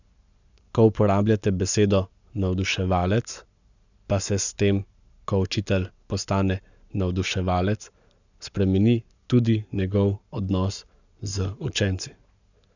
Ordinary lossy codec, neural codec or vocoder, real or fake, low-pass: none; codec, 44.1 kHz, 7.8 kbps, Pupu-Codec; fake; 7.2 kHz